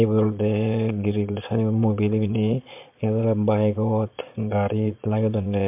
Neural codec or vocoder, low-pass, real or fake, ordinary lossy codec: vocoder, 22.05 kHz, 80 mel bands, Vocos; 3.6 kHz; fake; MP3, 32 kbps